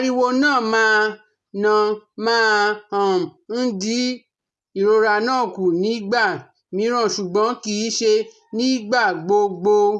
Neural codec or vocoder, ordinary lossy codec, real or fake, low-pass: none; none; real; none